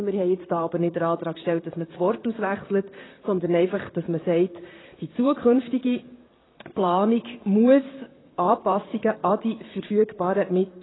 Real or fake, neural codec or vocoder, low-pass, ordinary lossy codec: fake; vocoder, 44.1 kHz, 128 mel bands, Pupu-Vocoder; 7.2 kHz; AAC, 16 kbps